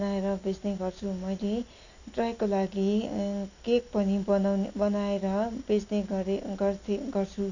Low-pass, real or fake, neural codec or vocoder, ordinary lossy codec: 7.2 kHz; real; none; none